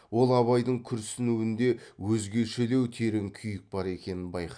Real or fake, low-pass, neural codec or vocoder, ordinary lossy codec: real; 9.9 kHz; none; none